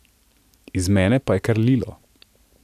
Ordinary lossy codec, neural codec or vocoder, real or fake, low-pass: none; none; real; 14.4 kHz